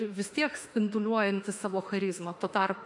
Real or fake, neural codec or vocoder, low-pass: fake; autoencoder, 48 kHz, 32 numbers a frame, DAC-VAE, trained on Japanese speech; 10.8 kHz